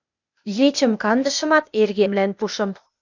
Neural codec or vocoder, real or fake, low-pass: codec, 16 kHz, 0.8 kbps, ZipCodec; fake; 7.2 kHz